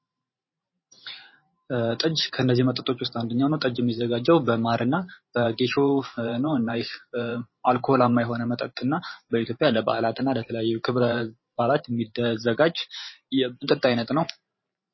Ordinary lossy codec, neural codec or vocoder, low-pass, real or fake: MP3, 24 kbps; vocoder, 44.1 kHz, 128 mel bands every 512 samples, BigVGAN v2; 7.2 kHz; fake